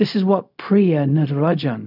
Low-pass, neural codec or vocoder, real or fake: 5.4 kHz; codec, 16 kHz, 0.4 kbps, LongCat-Audio-Codec; fake